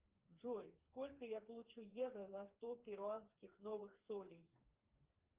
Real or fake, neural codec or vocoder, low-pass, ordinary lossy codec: fake; codec, 16 kHz, 4 kbps, FreqCodec, smaller model; 3.6 kHz; Opus, 32 kbps